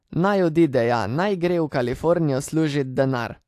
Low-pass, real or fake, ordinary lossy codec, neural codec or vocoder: 14.4 kHz; real; AAC, 64 kbps; none